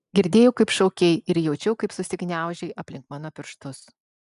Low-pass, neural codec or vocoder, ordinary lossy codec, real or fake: 10.8 kHz; none; MP3, 96 kbps; real